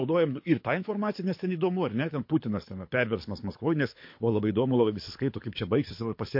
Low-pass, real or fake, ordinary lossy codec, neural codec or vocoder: 5.4 kHz; fake; MP3, 32 kbps; codec, 24 kHz, 6 kbps, HILCodec